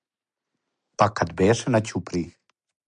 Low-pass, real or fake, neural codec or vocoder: 9.9 kHz; real; none